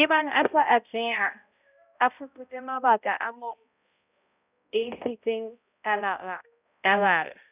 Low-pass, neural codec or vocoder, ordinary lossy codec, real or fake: 3.6 kHz; codec, 16 kHz, 0.5 kbps, X-Codec, HuBERT features, trained on balanced general audio; none; fake